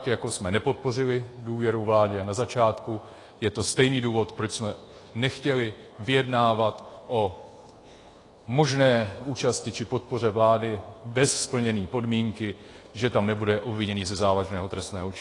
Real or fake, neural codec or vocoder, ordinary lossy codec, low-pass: fake; codec, 24 kHz, 1.2 kbps, DualCodec; AAC, 32 kbps; 10.8 kHz